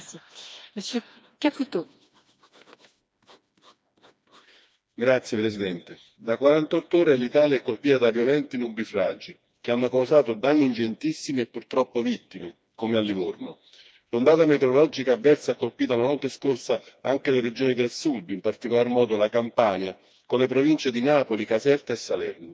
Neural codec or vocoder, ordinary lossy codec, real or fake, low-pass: codec, 16 kHz, 2 kbps, FreqCodec, smaller model; none; fake; none